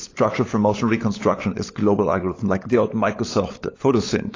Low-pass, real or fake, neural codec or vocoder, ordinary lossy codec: 7.2 kHz; fake; codec, 16 kHz, 16 kbps, FunCodec, trained on LibriTTS, 50 frames a second; AAC, 32 kbps